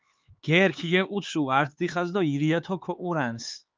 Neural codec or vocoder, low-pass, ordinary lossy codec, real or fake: codec, 16 kHz, 4 kbps, X-Codec, HuBERT features, trained on LibriSpeech; 7.2 kHz; Opus, 24 kbps; fake